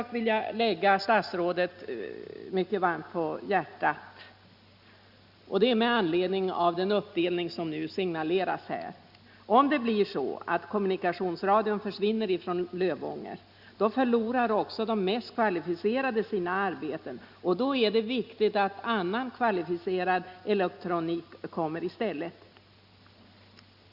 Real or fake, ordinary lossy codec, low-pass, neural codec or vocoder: real; Opus, 64 kbps; 5.4 kHz; none